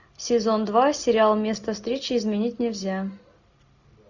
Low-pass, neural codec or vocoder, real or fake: 7.2 kHz; none; real